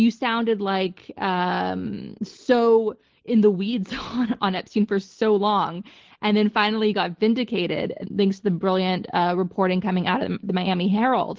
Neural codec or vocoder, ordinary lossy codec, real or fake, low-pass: none; Opus, 16 kbps; real; 7.2 kHz